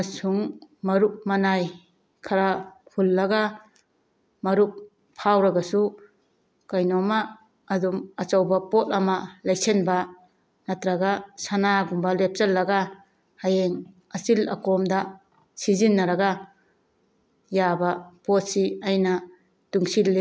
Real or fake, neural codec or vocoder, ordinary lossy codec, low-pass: real; none; none; none